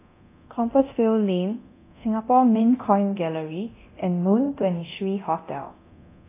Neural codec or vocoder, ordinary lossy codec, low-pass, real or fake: codec, 24 kHz, 0.9 kbps, DualCodec; AAC, 24 kbps; 3.6 kHz; fake